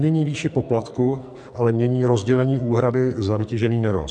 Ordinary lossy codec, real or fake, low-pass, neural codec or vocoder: MP3, 96 kbps; fake; 10.8 kHz; codec, 44.1 kHz, 2.6 kbps, SNAC